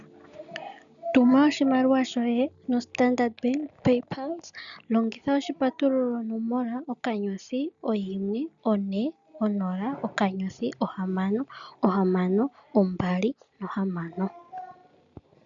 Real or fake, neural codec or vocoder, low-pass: real; none; 7.2 kHz